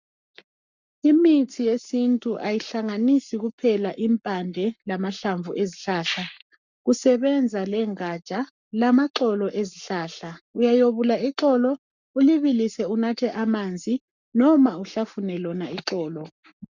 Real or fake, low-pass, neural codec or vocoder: fake; 7.2 kHz; codec, 44.1 kHz, 7.8 kbps, Pupu-Codec